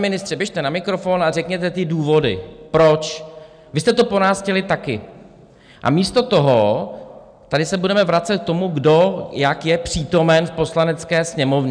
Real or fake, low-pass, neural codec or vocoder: real; 9.9 kHz; none